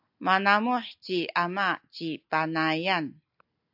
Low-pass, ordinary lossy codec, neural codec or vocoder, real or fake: 5.4 kHz; AAC, 48 kbps; none; real